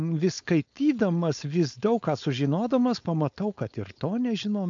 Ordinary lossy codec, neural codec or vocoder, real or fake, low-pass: AAC, 64 kbps; codec, 16 kHz, 4.8 kbps, FACodec; fake; 7.2 kHz